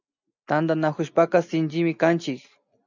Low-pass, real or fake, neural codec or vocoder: 7.2 kHz; real; none